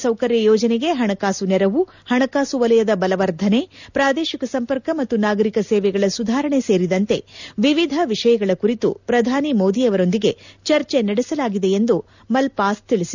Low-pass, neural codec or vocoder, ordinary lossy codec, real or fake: 7.2 kHz; none; none; real